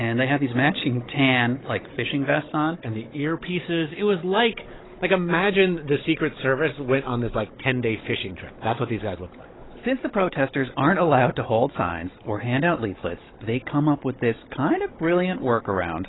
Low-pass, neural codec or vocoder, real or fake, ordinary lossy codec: 7.2 kHz; codec, 16 kHz, 16 kbps, FunCodec, trained on Chinese and English, 50 frames a second; fake; AAC, 16 kbps